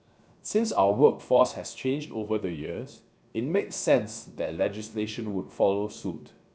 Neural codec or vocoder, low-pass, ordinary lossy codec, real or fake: codec, 16 kHz, 0.7 kbps, FocalCodec; none; none; fake